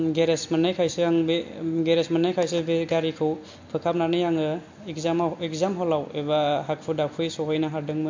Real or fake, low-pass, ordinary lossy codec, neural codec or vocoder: real; 7.2 kHz; MP3, 48 kbps; none